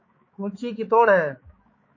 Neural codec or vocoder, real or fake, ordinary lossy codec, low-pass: codec, 16 kHz, 4 kbps, X-Codec, HuBERT features, trained on balanced general audio; fake; MP3, 32 kbps; 7.2 kHz